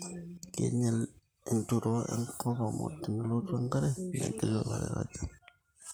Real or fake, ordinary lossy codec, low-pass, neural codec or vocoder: fake; none; none; vocoder, 44.1 kHz, 128 mel bands, Pupu-Vocoder